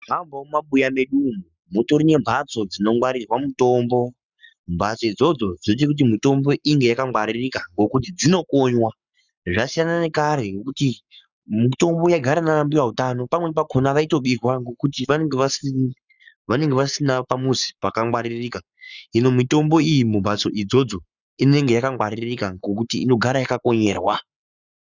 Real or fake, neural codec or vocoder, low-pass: fake; codec, 44.1 kHz, 7.8 kbps, Pupu-Codec; 7.2 kHz